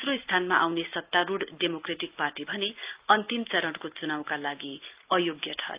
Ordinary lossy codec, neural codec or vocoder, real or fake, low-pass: Opus, 24 kbps; none; real; 3.6 kHz